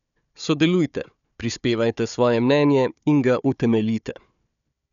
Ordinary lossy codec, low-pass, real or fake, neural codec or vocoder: none; 7.2 kHz; fake; codec, 16 kHz, 4 kbps, FunCodec, trained on Chinese and English, 50 frames a second